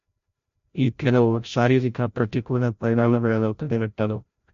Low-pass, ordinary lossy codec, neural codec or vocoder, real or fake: 7.2 kHz; MP3, 48 kbps; codec, 16 kHz, 0.5 kbps, FreqCodec, larger model; fake